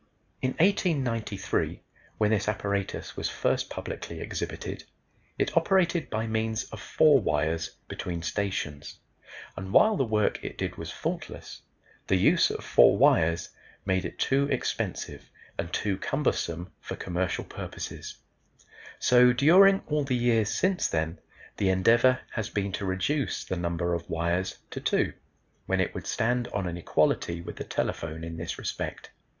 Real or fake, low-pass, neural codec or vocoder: real; 7.2 kHz; none